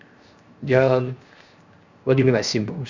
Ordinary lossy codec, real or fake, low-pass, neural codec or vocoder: Opus, 64 kbps; fake; 7.2 kHz; codec, 16 kHz, 0.7 kbps, FocalCodec